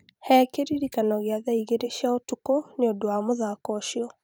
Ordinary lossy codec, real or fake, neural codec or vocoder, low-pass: none; fake; vocoder, 44.1 kHz, 128 mel bands every 512 samples, BigVGAN v2; none